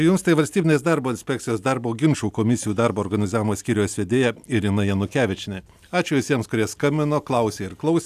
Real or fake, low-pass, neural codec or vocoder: real; 14.4 kHz; none